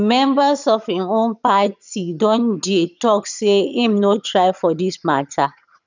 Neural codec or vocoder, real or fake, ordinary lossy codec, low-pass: vocoder, 22.05 kHz, 80 mel bands, HiFi-GAN; fake; none; 7.2 kHz